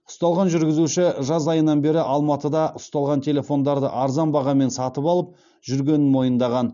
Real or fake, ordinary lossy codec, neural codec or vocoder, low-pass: real; none; none; 7.2 kHz